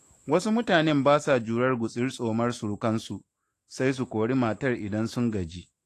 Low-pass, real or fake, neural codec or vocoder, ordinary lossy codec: 14.4 kHz; fake; autoencoder, 48 kHz, 128 numbers a frame, DAC-VAE, trained on Japanese speech; AAC, 48 kbps